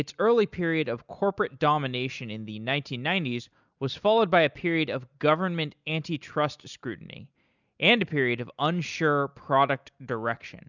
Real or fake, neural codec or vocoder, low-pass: real; none; 7.2 kHz